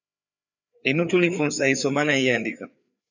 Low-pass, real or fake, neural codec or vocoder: 7.2 kHz; fake; codec, 16 kHz, 4 kbps, FreqCodec, larger model